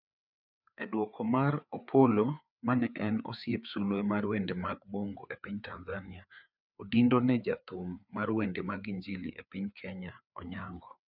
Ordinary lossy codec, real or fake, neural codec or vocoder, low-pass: none; fake; codec, 16 kHz, 4 kbps, FreqCodec, larger model; 5.4 kHz